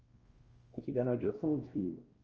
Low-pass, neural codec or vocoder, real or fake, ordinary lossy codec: 7.2 kHz; codec, 16 kHz, 1 kbps, X-Codec, WavLM features, trained on Multilingual LibriSpeech; fake; Opus, 24 kbps